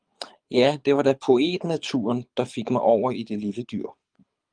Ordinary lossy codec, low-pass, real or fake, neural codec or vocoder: Opus, 32 kbps; 9.9 kHz; fake; codec, 24 kHz, 6 kbps, HILCodec